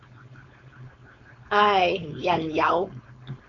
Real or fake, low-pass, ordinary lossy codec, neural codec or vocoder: fake; 7.2 kHz; Opus, 64 kbps; codec, 16 kHz, 4.8 kbps, FACodec